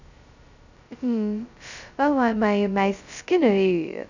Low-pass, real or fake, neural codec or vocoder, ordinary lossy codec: 7.2 kHz; fake; codec, 16 kHz, 0.2 kbps, FocalCodec; none